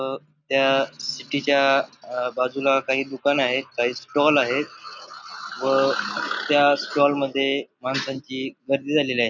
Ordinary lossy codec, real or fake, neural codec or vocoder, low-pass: none; real; none; 7.2 kHz